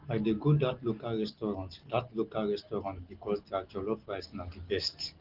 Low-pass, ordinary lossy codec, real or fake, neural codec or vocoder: 5.4 kHz; Opus, 16 kbps; real; none